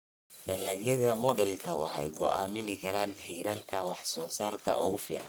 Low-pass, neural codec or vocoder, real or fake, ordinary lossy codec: none; codec, 44.1 kHz, 1.7 kbps, Pupu-Codec; fake; none